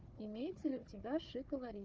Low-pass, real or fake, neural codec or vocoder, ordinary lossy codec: 7.2 kHz; fake; codec, 16 kHz, 4 kbps, FunCodec, trained on Chinese and English, 50 frames a second; Opus, 24 kbps